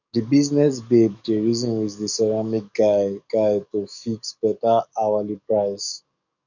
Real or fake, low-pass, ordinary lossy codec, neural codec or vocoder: fake; 7.2 kHz; none; codec, 44.1 kHz, 7.8 kbps, DAC